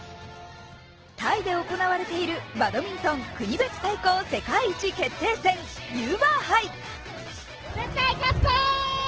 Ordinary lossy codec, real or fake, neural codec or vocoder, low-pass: Opus, 16 kbps; real; none; 7.2 kHz